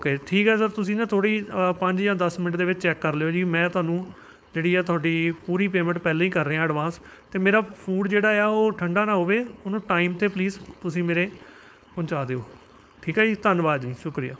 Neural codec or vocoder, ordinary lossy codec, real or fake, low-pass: codec, 16 kHz, 4.8 kbps, FACodec; none; fake; none